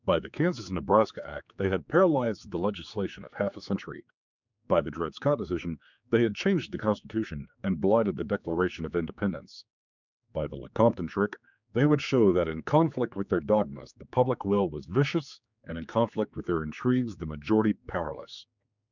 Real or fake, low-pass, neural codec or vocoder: fake; 7.2 kHz; codec, 16 kHz, 4 kbps, X-Codec, HuBERT features, trained on general audio